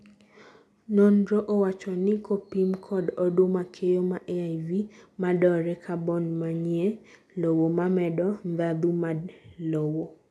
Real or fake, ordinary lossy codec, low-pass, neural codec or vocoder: real; none; none; none